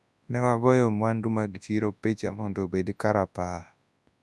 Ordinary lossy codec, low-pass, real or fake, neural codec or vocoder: none; none; fake; codec, 24 kHz, 0.9 kbps, WavTokenizer, large speech release